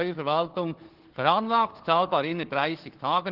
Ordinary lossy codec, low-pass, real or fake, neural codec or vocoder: Opus, 16 kbps; 5.4 kHz; fake; codec, 16 kHz, 4 kbps, FunCodec, trained on Chinese and English, 50 frames a second